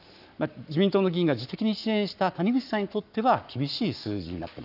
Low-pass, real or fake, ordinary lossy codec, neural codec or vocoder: 5.4 kHz; fake; none; codec, 44.1 kHz, 7.8 kbps, Pupu-Codec